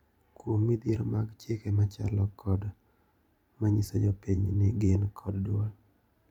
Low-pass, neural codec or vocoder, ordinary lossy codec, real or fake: 19.8 kHz; vocoder, 44.1 kHz, 128 mel bands every 512 samples, BigVGAN v2; none; fake